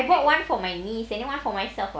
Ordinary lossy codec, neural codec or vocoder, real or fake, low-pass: none; none; real; none